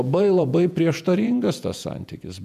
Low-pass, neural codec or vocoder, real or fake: 14.4 kHz; vocoder, 48 kHz, 128 mel bands, Vocos; fake